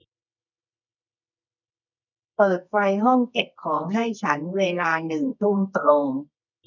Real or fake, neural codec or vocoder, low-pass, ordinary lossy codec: fake; codec, 24 kHz, 0.9 kbps, WavTokenizer, medium music audio release; 7.2 kHz; none